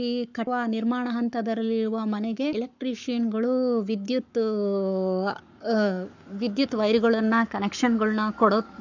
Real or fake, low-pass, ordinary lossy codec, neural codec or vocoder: fake; 7.2 kHz; none; codec, 44.1 kHz, 7.8 kbps, Pupu-Codec